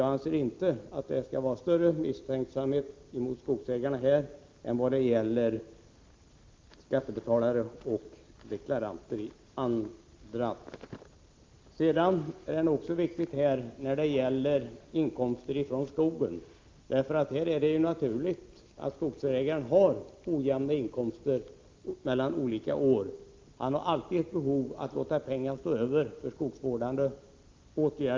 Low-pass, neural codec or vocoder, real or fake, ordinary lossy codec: 7.2 kHz; none; real; Opus, 32 kbps